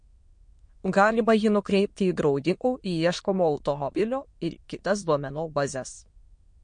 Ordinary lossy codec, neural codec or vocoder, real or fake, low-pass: MP3, 48 kbps; autoencoder, 22.05 kHz, a latent of 192 numbers a frame, VITS, trained on many speakers; fake; 9.9 kHz